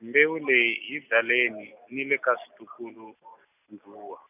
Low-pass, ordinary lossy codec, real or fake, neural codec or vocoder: 3.6 kHz; none; real; none